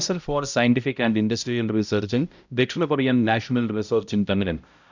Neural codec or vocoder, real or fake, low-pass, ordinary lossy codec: codec, 16 kHz, 0.5 kbps, X-Codec, HuBERT features, trained on balanced general audio; fake; 7.2 kHz; none